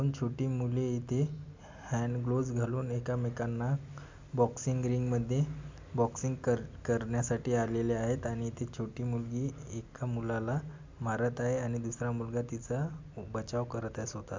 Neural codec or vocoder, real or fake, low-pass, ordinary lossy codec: none; real; 7.2 kHz; MP3, 64 kbps